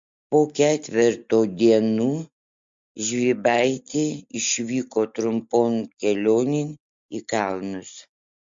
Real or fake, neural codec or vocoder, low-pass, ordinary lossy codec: real; none; 7.2 kHz; MP3, 48 kbps